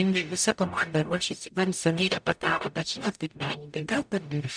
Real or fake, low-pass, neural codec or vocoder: fake; 9.9 kHz; codec, 44.1 kHz, 0.9 kbps, DAC